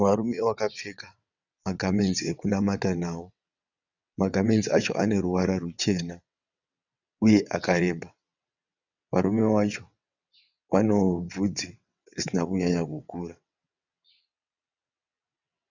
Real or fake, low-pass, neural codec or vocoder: fake; 7.2 kHz; vocoder, 22.05 kHz, 80 mel bands, WaveNeXt